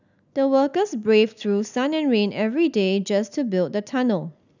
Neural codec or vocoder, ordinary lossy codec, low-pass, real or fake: none; none; 7.2 kHz; real